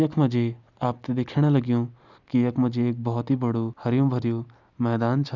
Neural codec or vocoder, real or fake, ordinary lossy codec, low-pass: none; real; none; 7.2 kHz